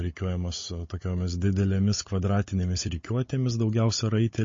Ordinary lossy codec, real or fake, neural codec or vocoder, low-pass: MP3, 32 kbps; fake; codec, 16 kHz, 16 kbps, FunCodec, trained on Chinese and English, 50 frames a second; 7.2 kHz